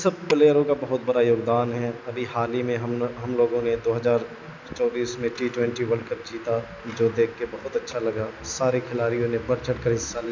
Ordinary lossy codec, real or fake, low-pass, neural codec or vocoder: none; real; 7.2 kHz; none